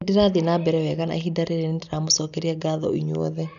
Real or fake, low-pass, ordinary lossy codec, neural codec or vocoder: real; 7.2 kHz; none; none